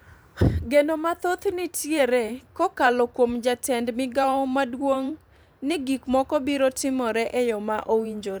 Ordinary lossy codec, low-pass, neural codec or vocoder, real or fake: none; none; vocoder, 44.1 kHz, 128 mel bands every 512 samples, BigVGAN v2; fake